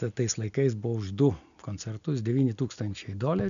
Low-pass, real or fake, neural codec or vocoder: 7.2 kHz; real; none